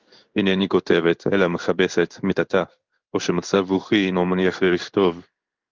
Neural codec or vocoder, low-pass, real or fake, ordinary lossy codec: codec, 16 kHz in and 24 kHz out, 1 kbps, XY-Tokenizer; 7.2 kHz; fake; Opus, 32 kbps